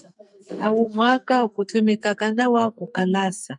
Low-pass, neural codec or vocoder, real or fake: 10.8 kHz; codec, 44.1 kHz, 2.6 kbps, SNAC; fake